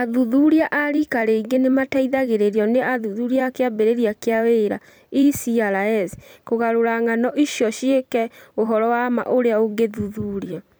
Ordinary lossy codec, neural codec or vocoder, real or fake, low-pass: none; vocoder, 44.1 kHz, 128 mel bands every 256 samples, BigVGAN v2; fake; none